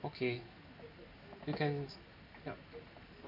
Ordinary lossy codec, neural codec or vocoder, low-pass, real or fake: MP3, 32 kbps; none; 5.4 kHz; real